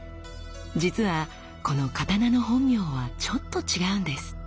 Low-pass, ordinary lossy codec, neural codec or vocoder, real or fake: none; none; none; real